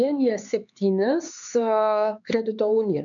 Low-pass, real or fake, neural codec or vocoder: 7.2 kHz; fake; codec, 16 kHz, 4 kbps, X-Codec, HuBERT features, trained on LibriSpeech